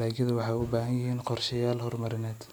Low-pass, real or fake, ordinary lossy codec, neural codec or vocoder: none; real; none; none